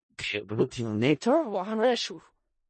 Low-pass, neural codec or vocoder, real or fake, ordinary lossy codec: 10.8 kHz; codec, 16 kHz in and 24 kHz out, 0.4 kbps, LongCat-Audio-Codec, four codebook decoder; fake; MP3, 32 kbps